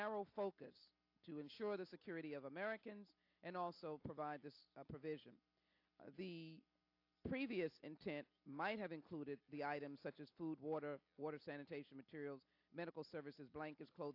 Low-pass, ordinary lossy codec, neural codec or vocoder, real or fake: 5.4 kHz; AAC, 48 kbps; none; real